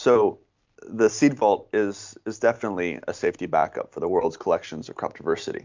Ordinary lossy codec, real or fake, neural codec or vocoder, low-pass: MP3, 64 kbps; real; none; 7.2 kHz